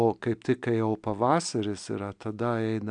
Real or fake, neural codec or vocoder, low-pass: real; none; 9.9 kHz